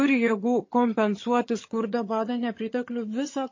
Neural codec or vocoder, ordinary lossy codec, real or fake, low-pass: vocoder, 22.05 kHz, 80 mel bands, HiFi-GAN; MP3, 32 kbps; fake; 7.2 kHz